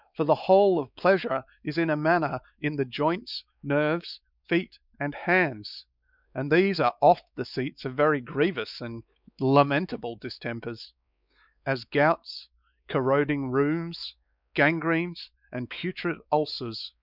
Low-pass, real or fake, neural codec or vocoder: 5.4 kHz; fake; codec, 16 kHz, 4 kbps, X-Codec, WavLM features, trained on Multilingual LibriSpeech